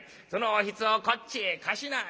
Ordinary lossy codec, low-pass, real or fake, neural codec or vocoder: none; none; real; none